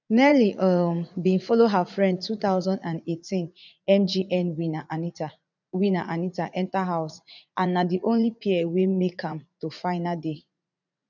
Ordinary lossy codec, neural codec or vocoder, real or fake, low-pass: none; vocoder, 44.1 kHz, 80 mel bands, Vocos; fake; 7.2 kHz